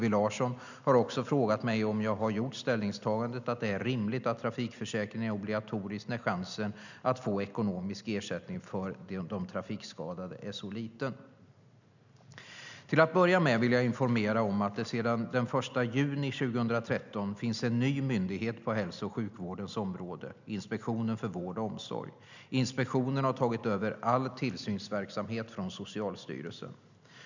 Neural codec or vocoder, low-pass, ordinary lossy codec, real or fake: none; 7.2 kHz; none; real